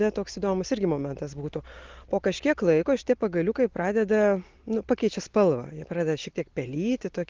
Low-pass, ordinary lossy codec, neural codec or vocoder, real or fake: 7.2 kHz; Opus, 16 kbps; none; real